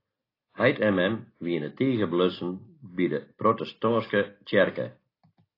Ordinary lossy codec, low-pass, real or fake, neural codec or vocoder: AAC, 24 kbps; 5.4 kHz; real; none